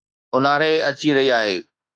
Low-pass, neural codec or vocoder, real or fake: 9.9 kHz; autoencoder, 48 kHz, 32 numbers a frame, DAC-VAE, trained on Japanese speech; fake